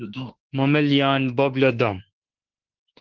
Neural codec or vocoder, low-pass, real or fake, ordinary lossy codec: autoencoder, 48 kHz, 32 numbers a frame, DAC-VAE, trained on Japanese speech; 7.2 kHz; fake; Opus, 16 kbps